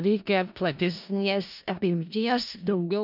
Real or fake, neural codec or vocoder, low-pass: fake; codec, 16 kHz in and 24 kHz out, 0.4 kbps, LongCat-Audio-Codec, four codebook decoder; 5.4 kHz